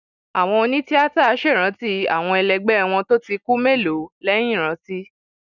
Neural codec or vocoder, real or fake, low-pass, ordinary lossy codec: none; real; 7.2 kHz; none